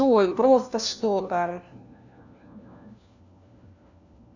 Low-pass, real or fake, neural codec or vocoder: 7.2 kHz; fake; codec, 16 kHz, 1 kbps, FunCodec, trained on LibriTTS, 50 frames a second